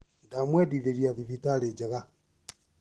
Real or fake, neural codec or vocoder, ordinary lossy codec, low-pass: real; none; Opus, 16 kbps; 10.8 kHz